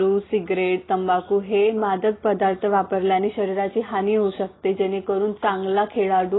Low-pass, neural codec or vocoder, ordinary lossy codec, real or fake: 7.2 kHz; none; AAC, 16 kbps; real